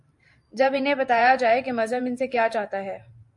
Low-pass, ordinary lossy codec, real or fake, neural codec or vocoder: 10.8 kHz; MP3, 48 kbps; fake; vocoder, 44.1 kHz, 128 mel bands every 512 samples, BigVGAN v2